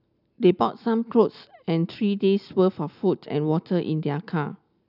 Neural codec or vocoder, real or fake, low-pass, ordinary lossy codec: none; real; 5.4 kHz; none